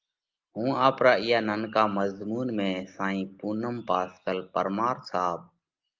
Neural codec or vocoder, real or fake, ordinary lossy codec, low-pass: none; real; Opus, 24 kbps; 7.2 kHz